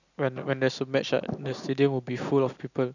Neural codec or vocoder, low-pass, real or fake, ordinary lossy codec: none; 7.2 kHz; real; none